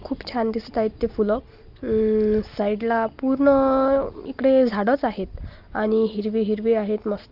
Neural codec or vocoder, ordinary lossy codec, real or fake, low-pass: none; Opus, 32 kbps; real; 5.4 kHz